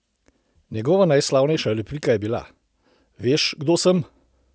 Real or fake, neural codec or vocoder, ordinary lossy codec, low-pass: real; none; none; none